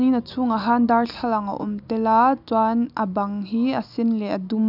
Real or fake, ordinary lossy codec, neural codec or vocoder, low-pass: real; none; none; 5.4 kHz